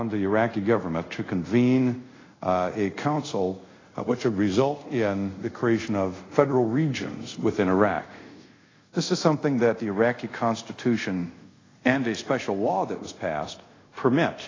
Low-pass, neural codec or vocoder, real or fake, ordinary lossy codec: 7.2 kHz; codec, 24 kHz, 0.5 kbps, DualCodec; fake; AAC, 32 kbps